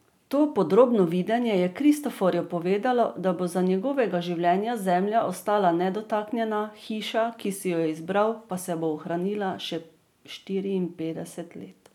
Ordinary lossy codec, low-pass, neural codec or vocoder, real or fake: none; 19.8 kHz; none; real